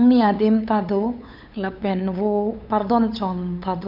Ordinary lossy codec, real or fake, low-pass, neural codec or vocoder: none; fake; 5.4 kHz; codec, 16 kHz, 8 kbps, FunCodec, trained on LibriTTS, 25 frames a second